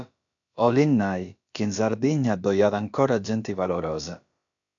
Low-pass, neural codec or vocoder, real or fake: 7.2 kHz; codec, 16 kHz, about 1 kbps, DyCAST, with the encoder's durations; fake